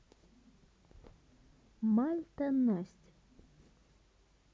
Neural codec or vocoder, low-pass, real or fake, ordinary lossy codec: none; none; real; none